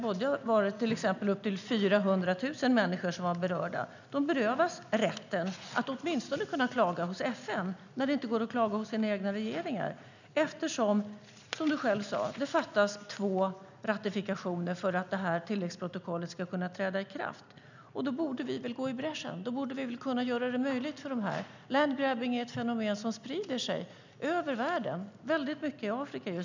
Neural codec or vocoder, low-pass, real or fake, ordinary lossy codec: none; 7.2 kHz; real; none